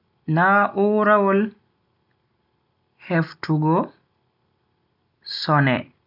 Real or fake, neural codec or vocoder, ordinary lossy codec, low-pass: real; none; none; 5.4 kHz